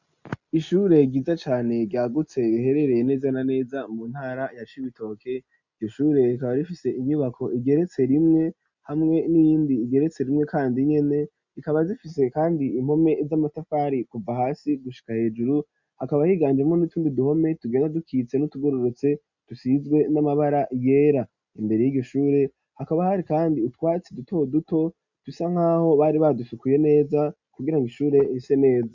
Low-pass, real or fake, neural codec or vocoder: 7.2 kHz; real; none